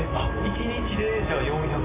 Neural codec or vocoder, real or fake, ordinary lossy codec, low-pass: none; real; AAC, 16 kbps; 3.6 kHz